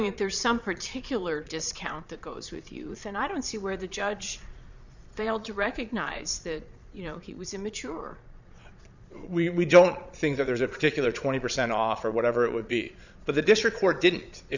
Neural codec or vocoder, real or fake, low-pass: vocoder, 22.05 kHz, 80 mel bands, Vocos; fake; 7.2 kHz